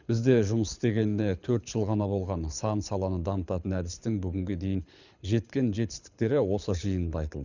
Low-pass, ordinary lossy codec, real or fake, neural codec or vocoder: 7.2 kHz; none; fake; codec, 24 kHz, 6 kbps, HILCodec